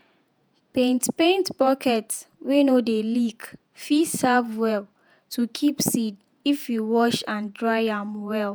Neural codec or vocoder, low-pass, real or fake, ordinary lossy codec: vocoder, 48 kHz, 128 mel bands, Vocos; none; fake; none